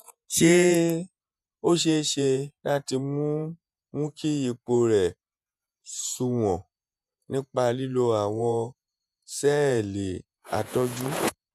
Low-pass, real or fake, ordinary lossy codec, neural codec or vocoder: 14.4 kHz; fake; none; vocoder, 48 kHz, 128 mel bands, Vocos